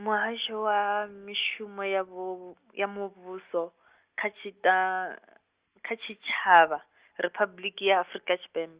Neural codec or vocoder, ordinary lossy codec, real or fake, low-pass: none; Opus, 32 kbps; real; 3.6 kHz